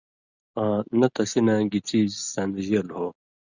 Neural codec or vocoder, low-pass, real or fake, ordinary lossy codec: none; 7.2 kHz; real; Opus, 64 kbps